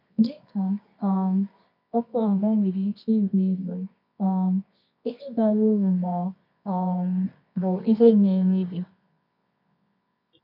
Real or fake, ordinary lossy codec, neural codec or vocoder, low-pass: fake; none; codec, 24 kHz, 0.9 kbps, WavTokenizer, medium music audio release; 5.4 kHz